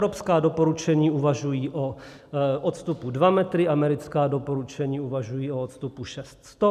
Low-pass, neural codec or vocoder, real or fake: 14.4 kHz; none; real